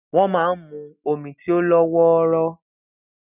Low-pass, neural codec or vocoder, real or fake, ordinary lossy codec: 3.6 kHz; none; real; none